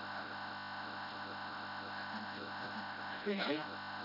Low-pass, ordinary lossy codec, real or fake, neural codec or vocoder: 5.4 kHz; none; fake; codec, 16 kHz, 0.5 kbps, FreqCodec, smaller model